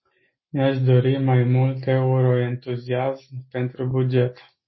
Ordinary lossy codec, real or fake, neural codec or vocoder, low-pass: MP3, 24 kbps; real; none; 7.2 kHz